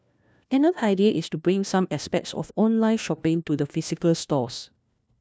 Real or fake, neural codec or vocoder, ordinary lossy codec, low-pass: fake; codec, 16 kHz, 1 kbps, FunCodec, trained on LibriTTS, 50 frames a second; none; none